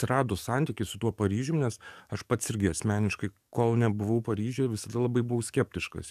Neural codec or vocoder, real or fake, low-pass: codec, 44.1 kHz, 7.8 kbps, DAC; fake; 14.4 kHz